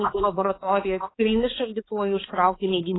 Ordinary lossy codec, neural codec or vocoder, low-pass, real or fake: AAC, 16 kbps; codec, 16 kHz, 4 kbps, X-Codec, HuBERT features, trained on balanced general audio; 7.2 kHz; fake